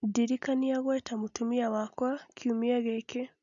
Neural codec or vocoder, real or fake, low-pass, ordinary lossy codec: none; real; 7.2 kHz; none